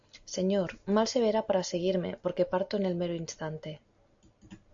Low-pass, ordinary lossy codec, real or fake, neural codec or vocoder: 7.2 kHz; AAC, 64 kbps; real; none